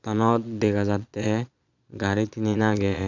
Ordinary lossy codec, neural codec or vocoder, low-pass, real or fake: none; vocoder, 22.05 kHz, 80 mel bands, Vocos; 7.2 kHz; fake